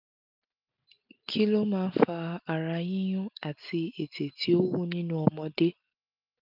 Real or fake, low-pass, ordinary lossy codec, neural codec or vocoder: real; 5.4 kHz; none; none